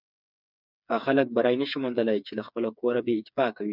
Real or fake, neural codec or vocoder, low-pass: fake; codec, 16 kHz, 4 kbps, FreqCodec, smaller model; 5.4 kHz